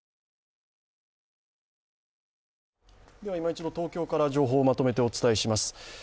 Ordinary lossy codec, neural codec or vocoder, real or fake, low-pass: none; none; real; none